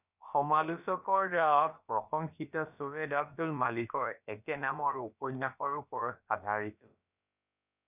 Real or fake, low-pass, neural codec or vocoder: fake; 3.6 kHz; codec, 16 kHz, about 1 kbps, DyCAST, with the encoder's durations